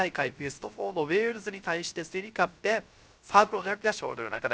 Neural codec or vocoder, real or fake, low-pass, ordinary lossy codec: codec, 16 kHz, 0.3 kbps, FocalCodec; fake; none; none